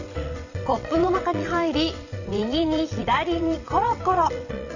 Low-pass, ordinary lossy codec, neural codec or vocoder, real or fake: 7.2 kHz; none; vocoder, 22.05 kHz, 80 mel bands, WaveNeXt; fake